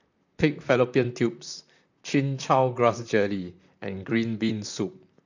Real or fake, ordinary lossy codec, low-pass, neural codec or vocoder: fake; none; 7.2 kHz; vocoder, 44.1 kHz, 128 mel bands, Pupu-Vocoder